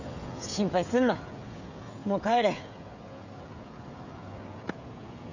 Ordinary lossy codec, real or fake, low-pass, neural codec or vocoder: none; fake; 7.2 kHz; codec, 16 kHz, 16 kbps, FreqCodec, smaller model